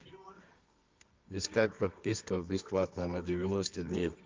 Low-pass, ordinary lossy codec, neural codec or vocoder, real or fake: 7.2 kHz; Opus, 24 kbps; codec, 24 kHz, 0.9 kbps, WavTokenizer, medium music audio release; fake